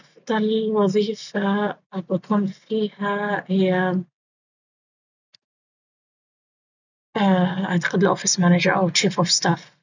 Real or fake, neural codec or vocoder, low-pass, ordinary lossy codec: real; none; 7.2 kHz; none